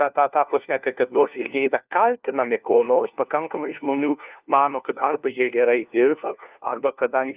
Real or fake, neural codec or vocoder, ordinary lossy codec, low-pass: fake; codec, 16 kHz, 1 kbps, FunCodec, trained on LibriTTS, 50 frames a second; Opus, 24 kbps; 3.6 kHz